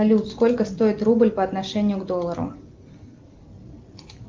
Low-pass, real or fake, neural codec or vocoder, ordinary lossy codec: 7.2 kHz; real; none; Opus, 32 kbps